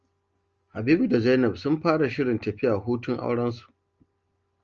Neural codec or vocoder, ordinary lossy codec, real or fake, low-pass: none; Opus, 24 kbps; real; 7.2 kHz